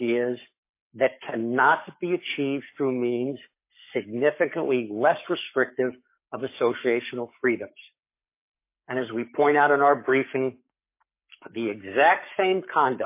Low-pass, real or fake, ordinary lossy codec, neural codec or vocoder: 3.6 kHz; fake; MP3, 24 kbps; codec, 44.1 kHz, 7.8 kbps, Pupu-Codec